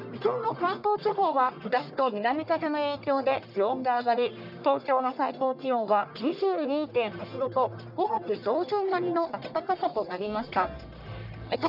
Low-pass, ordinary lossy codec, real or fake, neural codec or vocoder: 5.4 kHz; none; fake; codec, 44.1 kHz, 1.7 kbps, Pupu-Codec